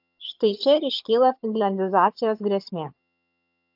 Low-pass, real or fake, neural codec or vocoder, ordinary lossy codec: 5.4 kHz; fake; vocoder, 22.05 kHz, 80 mel bands, HiFi-GAN; AAC, 48 kbps